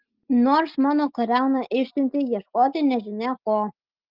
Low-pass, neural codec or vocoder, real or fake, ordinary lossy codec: 5.4 kHz; none; real; Opus, 24 kbps